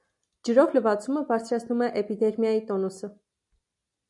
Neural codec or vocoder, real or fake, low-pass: none; real; 10.8 kHz